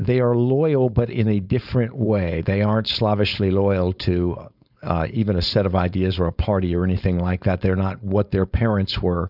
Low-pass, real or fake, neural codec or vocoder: 5.4 kHz; fake; codec, 16 kHz, 4.8 kbps, FACodec